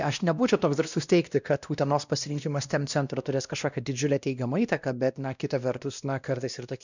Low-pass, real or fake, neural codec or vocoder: 7.2 kHz; fake; codec, 16 kHz, 1 kbps, X-Codec, WavLM features, trained on Multilingual LibriSpeech